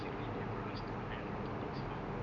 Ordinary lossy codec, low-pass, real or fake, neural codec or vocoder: none; 7.2 kHz; real; none